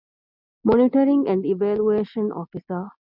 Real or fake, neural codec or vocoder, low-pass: real; none; 5.4 kHz